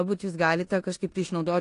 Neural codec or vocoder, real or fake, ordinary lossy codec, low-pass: codec, 16 kHz in and 24 kHz out, 0.9 kbps, LongCat-Audio-Codec, four codebook decoder; fake; AAC, 48 kbps; 10.8 kHz